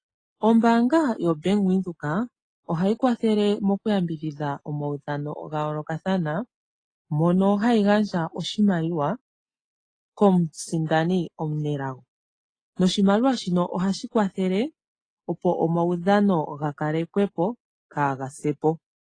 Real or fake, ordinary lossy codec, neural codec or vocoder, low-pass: real; AAC, 32 kbps; none; 9.9 kHz